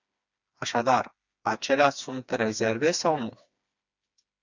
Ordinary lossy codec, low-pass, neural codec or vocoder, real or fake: Opus, 64 kbps; 7.2 kHz; codec, 16 kHz, 2 kbps, FreqCodec, smaller model; fake